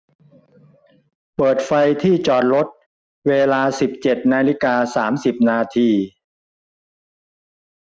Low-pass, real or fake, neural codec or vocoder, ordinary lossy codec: none; real; none; none